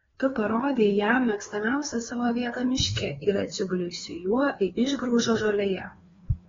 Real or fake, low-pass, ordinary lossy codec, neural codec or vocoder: fake; 7.2 kHz; AAC, 24 kbps; codec, 16 kHz, 2 kbps, FreqCodec, larger model